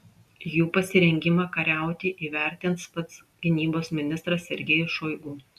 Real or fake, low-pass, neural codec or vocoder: real; 14.4 kHz; none